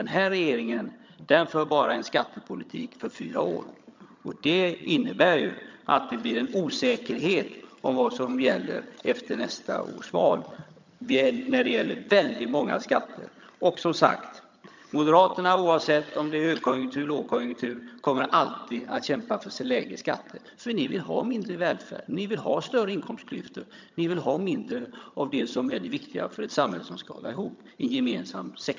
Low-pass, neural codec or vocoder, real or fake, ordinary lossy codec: 7.2 kHz; vocoder, 22.05 kHz, 80 mel bands, HiFi-GAN; fake; MP3, 64 kbps